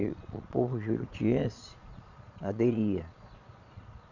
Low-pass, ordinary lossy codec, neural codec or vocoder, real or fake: 7.2 kHz; none; vocoder, 22.05 kHz, 80 mel bands, Vocos; fake